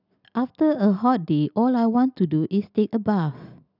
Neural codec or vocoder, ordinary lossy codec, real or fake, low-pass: none; none; real; 5.4 kHz